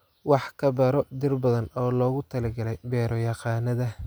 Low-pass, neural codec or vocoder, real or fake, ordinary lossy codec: none; none; real; none